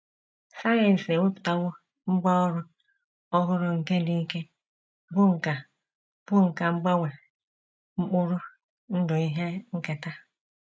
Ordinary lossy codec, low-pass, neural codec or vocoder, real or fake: none; none; none; real